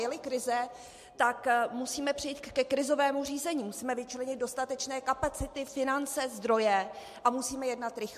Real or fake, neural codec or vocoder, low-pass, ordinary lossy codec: real; none; 14.4 kHz; MP3, 64 kbps